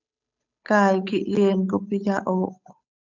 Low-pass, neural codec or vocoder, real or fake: 7.2 kHz; codec, 16 kHz, 8 kbps, FunCodec, trained on Chinese and English, 25 frames a second; fake